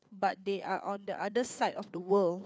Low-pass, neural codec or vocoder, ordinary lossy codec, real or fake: none; codec, 16 kHz, 4 kbps, FreqCodec, larger model; none; fake